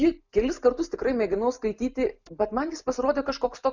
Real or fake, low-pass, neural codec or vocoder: real; 7.2 kHz; none